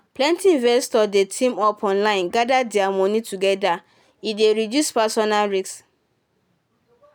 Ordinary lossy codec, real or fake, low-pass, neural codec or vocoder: none; real; none; none